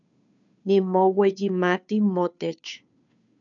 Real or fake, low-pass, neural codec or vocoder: fake; 7.2 kHz; codec, 16 kHz, 2 kbps, FunCodec, trained on Chinese and English, 25 frames a second